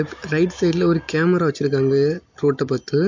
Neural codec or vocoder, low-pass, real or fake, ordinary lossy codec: none; 7.2 kHz; real; MP3, 48 kbps